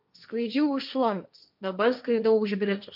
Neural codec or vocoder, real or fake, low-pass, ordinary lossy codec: codec, 16 kHz, 1.1 kbps, Voila-Tokenizer; fake; 5.4 kHz; MP3, 48 kbps